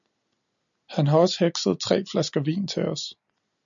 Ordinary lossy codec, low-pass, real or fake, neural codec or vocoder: MP3, 96 kbps; 7.2 kHz; real; none